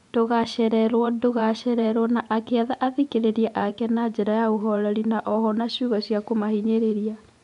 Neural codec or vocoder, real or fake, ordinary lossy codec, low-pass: vocoder, 24 kHz, 100 mel bands, Vocos; fake; none; 10.8 kHz